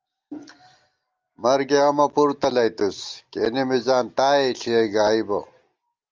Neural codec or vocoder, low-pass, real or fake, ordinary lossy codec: none; 7.2 kHz; real; Opus, 32 kbps